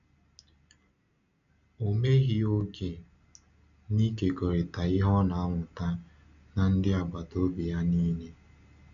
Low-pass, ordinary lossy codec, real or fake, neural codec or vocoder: 7.2 kHz; none; real; none